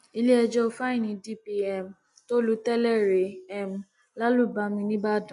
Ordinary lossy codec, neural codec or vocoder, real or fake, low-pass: none; none; real; 10.8 kHz